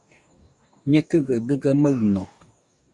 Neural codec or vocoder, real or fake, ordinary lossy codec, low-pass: codec, 44.1 kHz, 2.6 kbps, DAC; fake; Opus, 64 kbps; 10.8 kHz